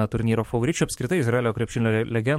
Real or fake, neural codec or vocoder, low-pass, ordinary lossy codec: fake; codec, 44.1 kHz, 7.8 kbps, DAC; 19.8 kHz; MP3, 64 kbps